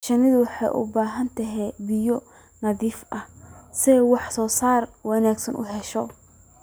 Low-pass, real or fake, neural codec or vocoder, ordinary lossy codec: none; real; none; none